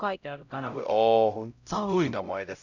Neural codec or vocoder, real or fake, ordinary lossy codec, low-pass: codec, 16 kHz, 0.5 kbps, X-Codec, HuBERT features, trained on LibriSpeech; fake; none; 7.2 kHz